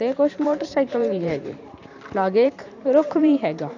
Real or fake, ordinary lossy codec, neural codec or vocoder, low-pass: fake; none; vocoder, 44.1 kHz, 128 mel bands every 512 samples, BigVGAN v2; 7.2 kHz